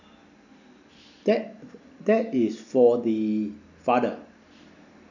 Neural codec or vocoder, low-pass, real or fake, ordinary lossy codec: none; 7.2 kHz; real; none